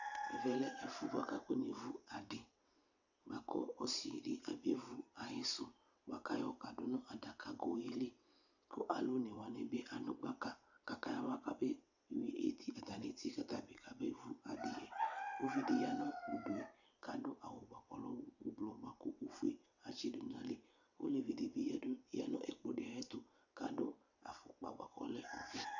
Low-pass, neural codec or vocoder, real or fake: 7.2 kHz; vocoder, 22.05 kHz, 80 mel bands, WaveNeXt; fake